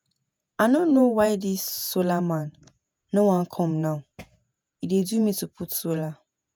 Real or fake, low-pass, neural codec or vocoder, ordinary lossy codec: fake; none; vocoder, 48 kHz, 128 mel bands, Vocos; none